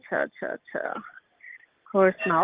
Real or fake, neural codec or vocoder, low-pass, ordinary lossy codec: real; none; 3.6 kHz; Opus, 32 kbps